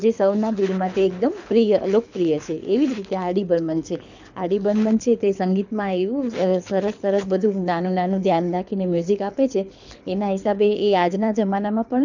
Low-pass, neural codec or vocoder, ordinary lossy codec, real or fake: 7.2 kHz; codec, 24 kHz, 6 kbps, HILCodec; none; fake